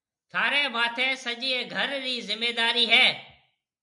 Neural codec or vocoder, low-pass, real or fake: none; 10.8 kHz; real